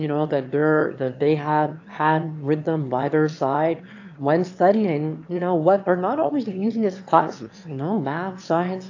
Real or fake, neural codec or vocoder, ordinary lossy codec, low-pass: fake; autoencoder, 22.05 kHz, a latent of 192 numbers a frame, VITS, trained on one speaker; AAC, 48 kbps; 7.2 kHz